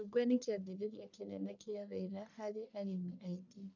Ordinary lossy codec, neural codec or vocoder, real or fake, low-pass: none; codec, 44.1 kHz, 1.7 kbps, Pupu-Codec; fake; 7.2 kHz